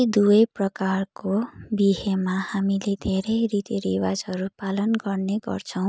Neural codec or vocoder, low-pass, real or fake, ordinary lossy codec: none; none; real; none